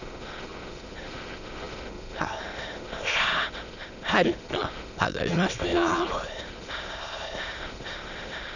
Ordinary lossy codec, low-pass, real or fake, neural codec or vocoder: none; 7.2 kHz; fake; autoencoder, 22.05 kHz, a latent of 192 numbers a frame, VITS, trained on many speakers